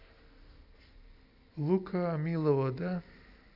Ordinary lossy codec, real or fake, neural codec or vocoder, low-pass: none; real; none; 5.4 kHz